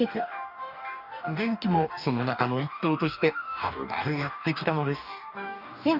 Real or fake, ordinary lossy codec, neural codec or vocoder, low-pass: fake; none; codec, 44.1 kHz, 2.6 kbps, DAC; 5.4 kHz